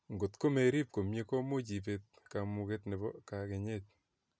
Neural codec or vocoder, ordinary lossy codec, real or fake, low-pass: none; none; real; none